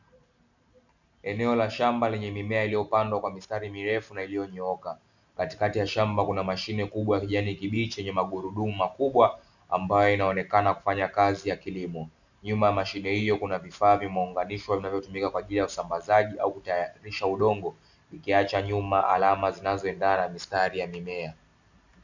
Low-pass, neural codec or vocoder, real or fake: 7.2 kHz; none; real